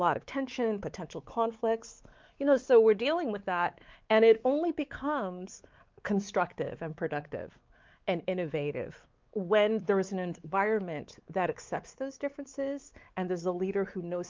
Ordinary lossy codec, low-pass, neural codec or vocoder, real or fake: Opus, 32 kbps; 7.2 kHz; codec, 16 kHz, 4 kbps, X-Codec, WavLM features, trained on Multilingual LibriSpeech; fake